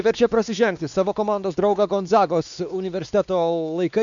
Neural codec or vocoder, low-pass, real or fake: codec, 16 kHz, 2 kbps, X-Codec, WavLM features, trained on Multilingual LibriSpeech; 7.2 kHz; fake